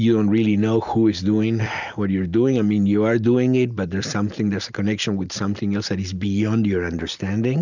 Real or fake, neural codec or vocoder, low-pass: real; none; 7.2 kHz